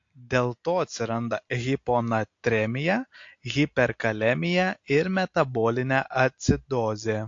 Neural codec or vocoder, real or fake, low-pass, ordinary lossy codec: none; real; 7.2 kHz; AAC, 48 kbps